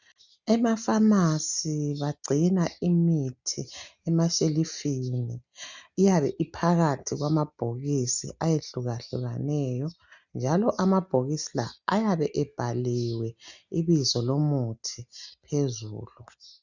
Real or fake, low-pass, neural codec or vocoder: real; 7.2 kHz; none